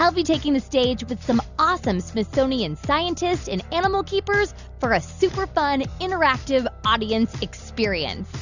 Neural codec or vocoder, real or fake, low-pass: none; real; 7.2 kHz